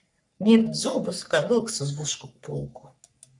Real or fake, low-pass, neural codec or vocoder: fake; 10.8 kHz; codec, 44.1 kHz, 3.4 kbps, Pupu-Codec